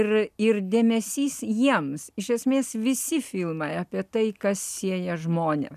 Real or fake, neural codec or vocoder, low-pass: real; none; 14.4 kHz